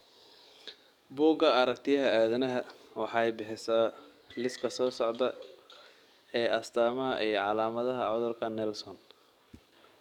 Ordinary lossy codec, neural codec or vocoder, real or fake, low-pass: none; codec, 44.1 kHz, 7.8 kbps, DAC; fake; 19.8 kHz